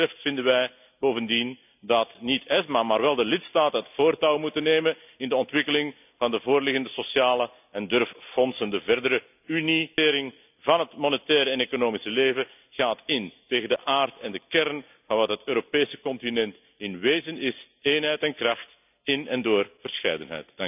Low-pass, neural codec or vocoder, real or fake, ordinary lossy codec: 3.6 kHz; none; real; none